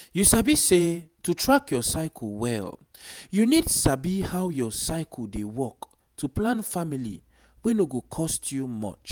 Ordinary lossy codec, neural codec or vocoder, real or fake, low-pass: none; vocoder, 48 kHz, 128 mel bands, Vocos; fake; none